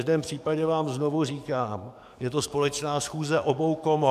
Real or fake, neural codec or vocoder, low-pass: fake; codec, 44.1 kHz, 7.8 kbps, DAC; 14.4 kHz